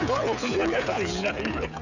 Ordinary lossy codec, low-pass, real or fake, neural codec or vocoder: none; 7.2 kHz; fake; codec, 16 kHz, 16 kbps, FreqCodec, smaller model